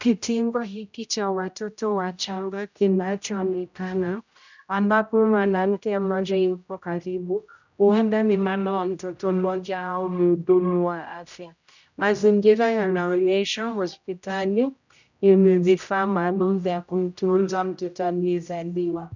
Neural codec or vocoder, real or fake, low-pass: codec, 16 kHz, 0.5 kbps, X-Codec, HuBERT features, trained on general audio; fake; 7.2 kHz